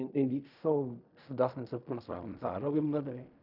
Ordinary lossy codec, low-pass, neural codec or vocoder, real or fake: none; 5.4 kHz; codec, 16 kHz in and 24 kHz out, 0.4 kbps, LongCat-Audio-Codec, fine tuned four codebook decoder; fake